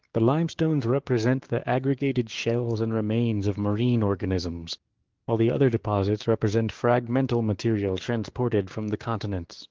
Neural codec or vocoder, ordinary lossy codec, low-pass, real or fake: codec, 16 kHz, 6 kbps, DAC; Opus, 16 kbps; 7.2 kHz; fake